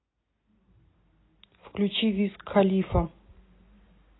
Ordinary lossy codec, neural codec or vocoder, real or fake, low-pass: AAC, 16 kbps; none; real; 7.2 kHz